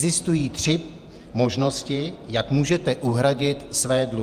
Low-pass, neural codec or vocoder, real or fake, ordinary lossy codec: 14.4 kHz; vocoder, 48 kHz, 128 mel bands, Vocos; fake; Opus, 32 kbps